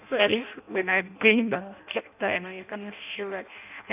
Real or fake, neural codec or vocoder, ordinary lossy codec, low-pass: fake; codec, 16 kHz in and 24 kHz out, 0.6 kbps, FireRedTTS-2 codec; none; 3.6 kHz